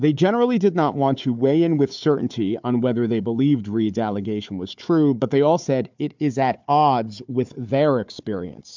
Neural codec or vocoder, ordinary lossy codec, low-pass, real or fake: codec, 16 kHz, 4 kbps, FunCodec, trained on Chinese and English, 50 frames a second; MP3, 64 kbps; 7.2 kHz; fake